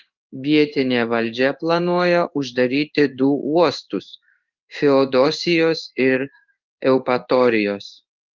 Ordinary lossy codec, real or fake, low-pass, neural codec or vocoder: Opus, 24 kbps; fake; 7.2 kHz; codec, 16 kHz in and 24 kHz out, 1 kbps, XY-Tokenizer